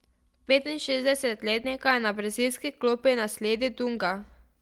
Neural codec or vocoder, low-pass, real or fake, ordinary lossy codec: none; 19.8 kHz; real; Opus, 24 kbps